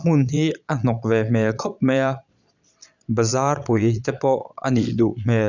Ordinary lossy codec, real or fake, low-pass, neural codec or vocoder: none; fake; 7.2 kHz; vocoder, 22.05 kHz, 80 mel bands, Vocos